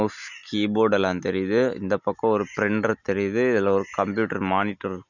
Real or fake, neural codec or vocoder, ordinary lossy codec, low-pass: real; none; none; 7.2 kHz